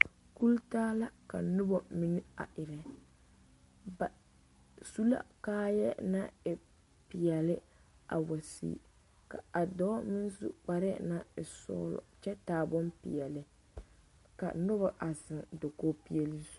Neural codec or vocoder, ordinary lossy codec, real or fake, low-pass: none; MP3, 48 kbps; real; 14.4 kHz